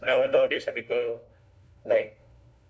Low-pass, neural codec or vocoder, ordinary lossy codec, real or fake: none; codec, 16 kHz, 1 kbps, FunCodec, trained on LibriTTS, 50 frames a second; none; fake